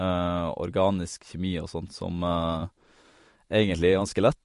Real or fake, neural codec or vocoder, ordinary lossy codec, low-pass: fake; vocoder, 44.1 kHz, 128 mel bands every 512 samples, BigVGAN v2; MP3, 48 kbps; 14.4 kHz